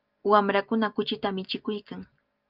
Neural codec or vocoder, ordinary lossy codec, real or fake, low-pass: none; Opus, 24 kbps; real; 5.4 kHz